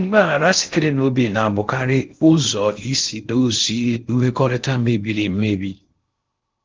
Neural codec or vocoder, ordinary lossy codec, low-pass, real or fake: codec, 16 kHz in and 24 kHz out, 0.6 kbps, FocalCodec, streaming, 4096 codes; Opus, 24 kbps; 7.2 kHz; fake